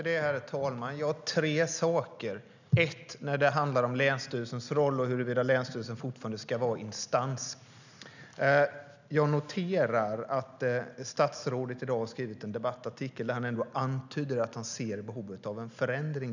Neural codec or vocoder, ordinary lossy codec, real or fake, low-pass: none; none; real; 7.2 kHz